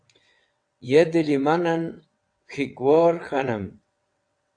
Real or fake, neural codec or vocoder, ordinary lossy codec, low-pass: fake; vocoder, 22.05 kHz, 80 mel bands, WaveNeXt; AAC, 64 kbps; 9.9 kHz